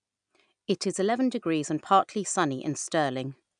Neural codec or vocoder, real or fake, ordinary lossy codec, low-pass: none; real; none; 9.9 kHz